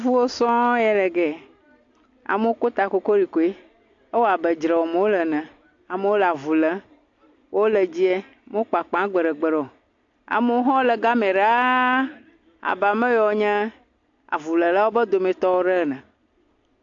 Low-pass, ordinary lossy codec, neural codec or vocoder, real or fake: 7.2 kHz; AAC, 48 kbps; none; real